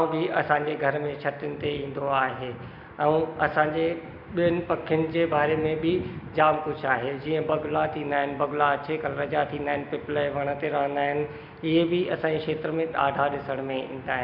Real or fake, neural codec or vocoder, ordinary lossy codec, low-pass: real; none; none; 5.4 kHz